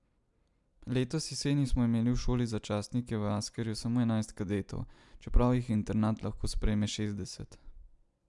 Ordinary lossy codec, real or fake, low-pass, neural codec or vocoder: MP3, 96 kbps; real; 10.8 kHz; none